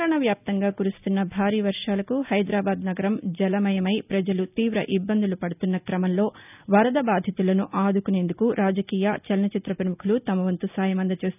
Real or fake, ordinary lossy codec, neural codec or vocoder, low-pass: real; none; none; 3.6 kHz